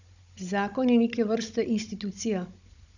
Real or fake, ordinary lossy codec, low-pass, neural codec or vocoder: fake; none; 7.2 kHz; codec, 16 kHz, 16 kbps, FunCodec, trained on Chinese and English, 50 frames a second